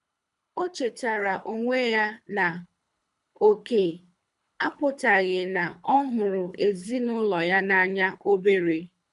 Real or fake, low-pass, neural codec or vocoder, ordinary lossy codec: fake; 10.8 kHz; codec, 24 kHz, 3 kbps, HILCodec; none